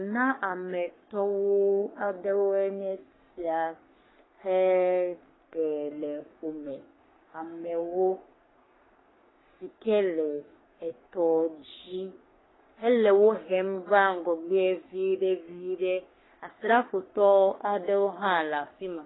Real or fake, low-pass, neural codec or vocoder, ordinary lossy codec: fake; 7.2 kHz; codec, 44.1 kHz, 3.4 kbps, Pupu-Codec; AAC, 16 kbps